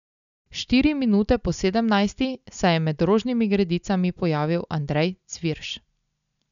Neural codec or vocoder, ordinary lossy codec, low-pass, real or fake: none; none; 7.2 kHz; real